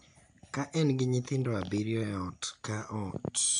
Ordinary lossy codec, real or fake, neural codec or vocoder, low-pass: none; real; none; 9.9 kHz